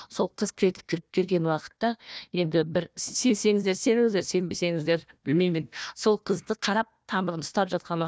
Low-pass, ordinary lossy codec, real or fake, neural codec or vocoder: none; none; fake; codec, 16 kHz, 1 kbps, FunCodec, trained on Chinese and English, 50 frames a second